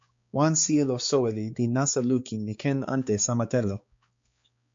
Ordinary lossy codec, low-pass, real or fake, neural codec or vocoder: MP3, 48 kbps; 7.2 kHz; fake; codec, 16 kHz, 4 kbps, X-Codec, HuBERT features, trained on balanced general audio